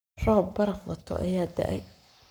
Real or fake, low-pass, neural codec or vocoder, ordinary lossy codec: fake; none; codec, 44.1 kHz, 7.8 kbps, Pupu-Codec; none